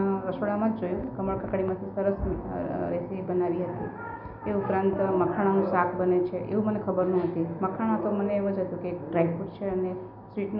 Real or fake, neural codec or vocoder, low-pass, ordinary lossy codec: real; none; 5.4 kHz; none